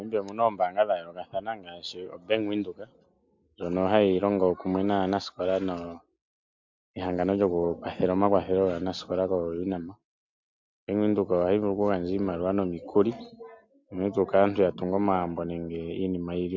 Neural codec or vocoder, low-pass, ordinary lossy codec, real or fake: none; 7.2 kHz; MP3, 64 kbps; real